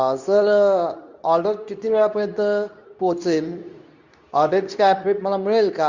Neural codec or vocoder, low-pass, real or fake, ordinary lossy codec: codec, 24 kHz, 0.9 kbps, WavTokenizer, medium speech release version 2; 7.2 kHz; fake; Opus, 64 kbps